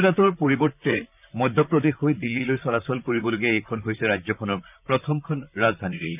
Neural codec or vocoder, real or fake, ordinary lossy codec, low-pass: vocoder, 44.1 kHz, 128 mel bands, Pupu-Vocoder; fake; none; 3.6 kHz